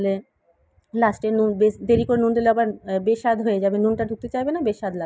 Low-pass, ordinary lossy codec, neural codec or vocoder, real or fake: none; none; none; real